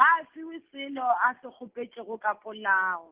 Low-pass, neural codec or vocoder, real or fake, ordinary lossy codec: 3.6 kHz; none; real; Opus, 16 kbps